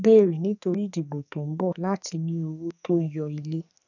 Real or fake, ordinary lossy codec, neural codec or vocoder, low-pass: fake; none; codec, 44.1 kHz, 2.6 kbps, SNAC; 7.2 kHz